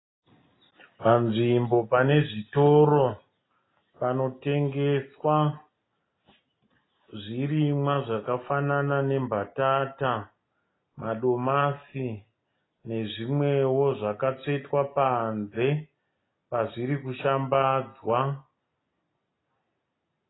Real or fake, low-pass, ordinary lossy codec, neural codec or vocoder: real; 7.2 kHz; AAC, 16 kbps; none